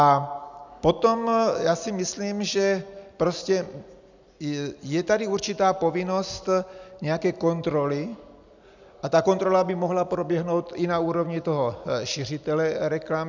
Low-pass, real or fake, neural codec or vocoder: 7.2 kHz; real; none